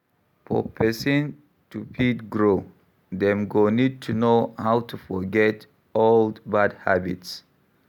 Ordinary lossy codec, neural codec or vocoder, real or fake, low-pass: none; none; real; 19.8 kHz